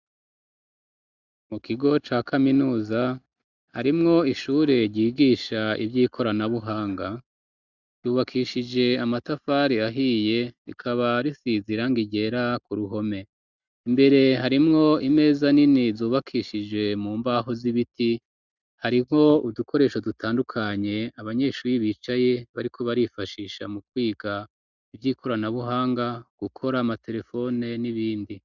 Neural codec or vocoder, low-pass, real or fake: none; 7.2 kHz; real